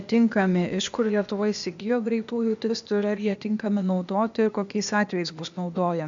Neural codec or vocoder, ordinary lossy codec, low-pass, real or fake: codec, 16 kHz, 0.8 kbps, ZipCodec; MP3, 64 kbps; 7.2 kHz; fake